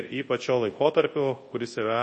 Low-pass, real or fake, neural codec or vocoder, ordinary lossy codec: 10.8 kHz; fake; codec, 24 kHz, 0.9 kbps, WavTokenizer, large speech release; MP3, 32 kbps